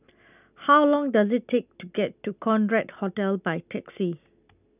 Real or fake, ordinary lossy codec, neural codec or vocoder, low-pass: real; none; none; 3.6 kHz